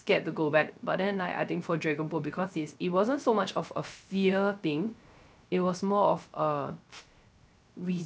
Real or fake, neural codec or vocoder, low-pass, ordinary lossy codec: fake; codec, 16 kHz, 0.3 kbps, FocalCodec; none; none